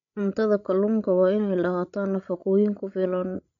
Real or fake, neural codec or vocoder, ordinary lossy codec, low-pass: fake; codec, 16 kHz, 16 kbps, FreqCodec, larger model; none; 7.2 kHz